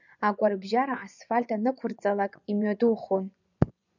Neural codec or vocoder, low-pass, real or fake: vocoder, 24 kHz, 100 mel bands, Vocos; 7.2 kHz; fake